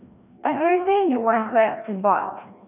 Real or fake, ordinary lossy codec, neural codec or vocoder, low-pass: fake; none; codec, 16 kHz, 1 kbps, FreqCodec, larger model; 3.6 kHz